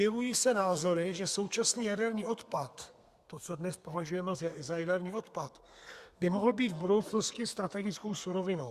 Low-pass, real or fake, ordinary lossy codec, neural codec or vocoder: 14.4 kHz; fake; Opus, 64 kbps; codec, 32 kHz, 1.9 kbps, SNAC